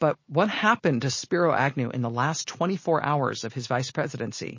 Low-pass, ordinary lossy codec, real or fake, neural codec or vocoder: 7.2 kHz; MP3, 32 kbps; real; none